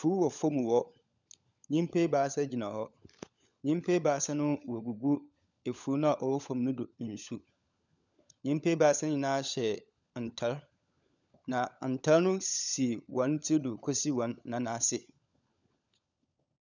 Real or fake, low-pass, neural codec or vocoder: fake; 7.2 kHz; codec, 16 kHz, 16 kbps, FunCodec, trained on LibriTTS, 50 frames a second